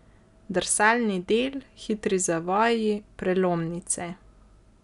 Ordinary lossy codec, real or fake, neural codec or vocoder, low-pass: none; real; none; 10.8 kHz